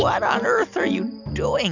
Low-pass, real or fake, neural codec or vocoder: 7.2 kHz; real; none